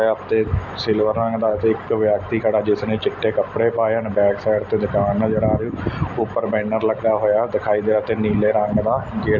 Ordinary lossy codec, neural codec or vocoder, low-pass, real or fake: none; none; 7.2 kHz; real